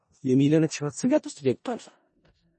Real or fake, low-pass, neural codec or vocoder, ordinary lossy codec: fake; 10.8 kHz; codec, 16 kHz in and 24 kHz out, 0.4 kbps, LongCat-Audio-Codec, four codebook decoder; MP3, 32 kbps